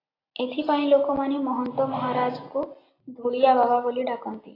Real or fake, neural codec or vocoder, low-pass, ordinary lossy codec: real; none; 5.4 kHz; AAC, 24 kbps